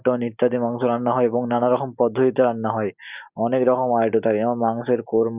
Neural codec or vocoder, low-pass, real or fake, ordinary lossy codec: none; 3.6 kHz; real; Opus, 64 kbps